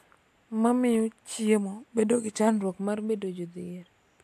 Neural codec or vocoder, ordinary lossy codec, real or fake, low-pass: none; AAC, 96 kbps; real; 14.4 kHz